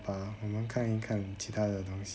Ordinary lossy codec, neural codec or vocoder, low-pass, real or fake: none; none; none; real